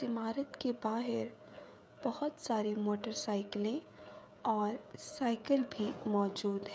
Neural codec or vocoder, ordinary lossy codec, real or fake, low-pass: codec, 16 kHz, 16 kbps, FreqCodec, smaller model; none; fake; none